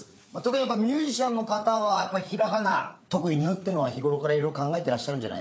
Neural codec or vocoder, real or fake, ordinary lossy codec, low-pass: codec, 16 kHz, 4 kbps, FreqCodec, larger model; fake; none; none